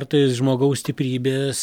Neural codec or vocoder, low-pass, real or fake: none; 19.8 kHz; real